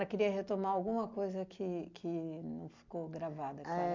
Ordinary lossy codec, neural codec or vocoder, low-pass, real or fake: MP3, 64 kbps; none; 7.2 kHz; real